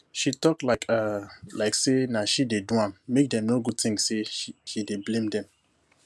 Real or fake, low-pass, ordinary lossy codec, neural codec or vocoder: real; none; none; none